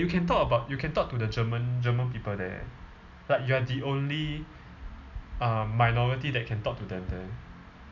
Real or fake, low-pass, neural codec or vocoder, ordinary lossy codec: real; 7.2 kHz; none; none